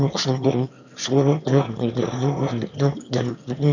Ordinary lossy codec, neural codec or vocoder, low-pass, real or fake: none; autoencoder, 22.05 kHz, a latent of 192 numbers a frame, VITS, trained on one speaker; 7.2 kHz; fake